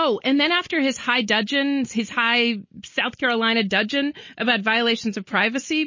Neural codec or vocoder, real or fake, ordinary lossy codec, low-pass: none; real; MP3, 32 kbps; 7.2 kHz